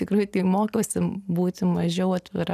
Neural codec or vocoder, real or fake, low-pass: none; real; 14.4 kHz